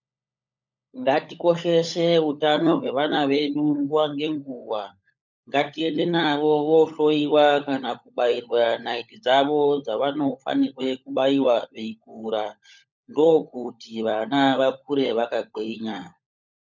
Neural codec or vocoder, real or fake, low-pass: codec, 16 kHz, 16 kbps, FunCodec, trained on LibriTTS, 50 frames a second; fake; 7.2 kHz